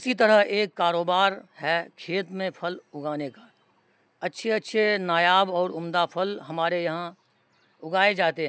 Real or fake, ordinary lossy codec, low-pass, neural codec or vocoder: real; none; none; none